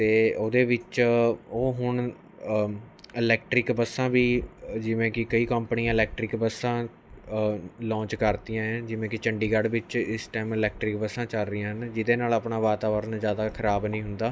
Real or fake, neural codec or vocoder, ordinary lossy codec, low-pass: real; none; none; none